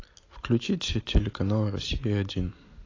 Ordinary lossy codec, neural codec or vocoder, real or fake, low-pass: AAC, 32 kbps; none; real; 7.2 kHz